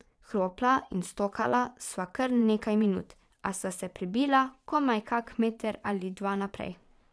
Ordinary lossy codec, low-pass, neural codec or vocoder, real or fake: none; none; vocoder, 22.05 kHz, 80 mel bands, Vocos; fake